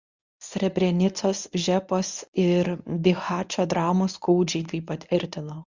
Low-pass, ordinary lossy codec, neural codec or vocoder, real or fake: 7.2 kHz; Opus, 64 kbps; codec, 24 kHz, 0.9 kbps, WavTokenizer, medium speech release version 1; fake